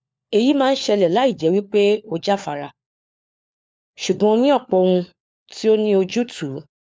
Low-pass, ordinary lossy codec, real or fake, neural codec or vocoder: none; none; fake; codec, 16 kHz, 4 kbps, FunCodec, trained on LibriTTS, 50 frames a second